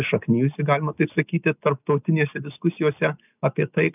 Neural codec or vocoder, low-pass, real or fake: none; 3.6 kHz; real